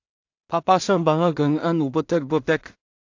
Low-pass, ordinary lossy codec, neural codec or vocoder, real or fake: 7.2 kHz; AAC, 48 kbps; codec, 16 kHz in and 24 kHz out, 0.4 kbps, LongCat-Audio-Codec, two codebook decoder; fake